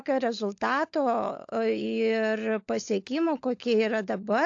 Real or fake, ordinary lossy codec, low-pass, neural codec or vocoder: fake; AAC, 48 kbps; 7.2 kHz; codec, 16 kHz, 16 kbps, FunCodec, trained on LibriTTS, 50 frames a second